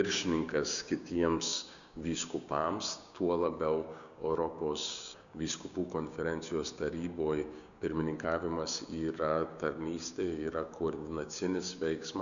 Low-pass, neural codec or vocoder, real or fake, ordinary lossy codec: 7.2 kHz; codec, 16 kHz, 6 kbps, DAC; fake; MP3, 96 kbps